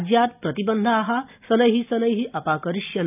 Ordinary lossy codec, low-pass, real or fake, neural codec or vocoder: none; 3.6 kHz; real; none